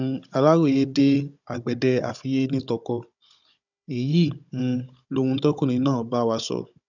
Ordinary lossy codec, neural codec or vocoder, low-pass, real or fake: none; codec, 16 kHz, 16 kbps, FunCodec, trained on Chinese and English, 50 frames a second; 7.2 kHz; fake